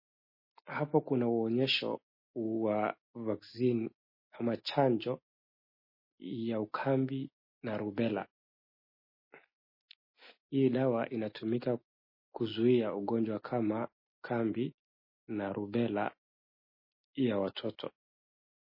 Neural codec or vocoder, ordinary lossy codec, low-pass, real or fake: none; MP3, 24 kbps; 5.4 kHz; real